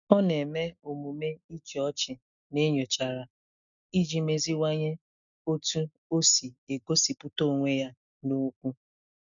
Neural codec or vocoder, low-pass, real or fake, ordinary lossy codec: none; 7.2 kHz; real; none